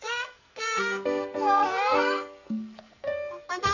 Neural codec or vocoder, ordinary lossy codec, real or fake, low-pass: codec, 44.1 kHz, 2.6 kbps, SNAC; none; fake; 7.2 kHz